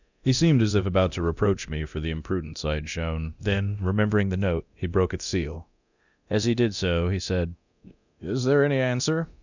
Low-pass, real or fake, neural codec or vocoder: 7.2 kHz; fake; codec, 24 kHz, 0.9 kbps, DualCodec